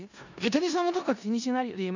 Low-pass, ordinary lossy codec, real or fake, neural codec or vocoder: 7.2 kHz; none; fake; codec, 16 kHz in and 24 kHz out, 0.4 kbps, LongCat-Audio-Codec, four codebook decoder